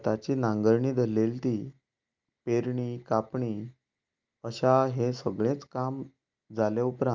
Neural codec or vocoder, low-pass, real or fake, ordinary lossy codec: none; 7.2 kHz; real; Opus, 32 kbps